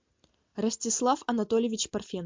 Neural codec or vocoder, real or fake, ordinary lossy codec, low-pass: none; real; MP3, 64 kbps; 7.2 kHz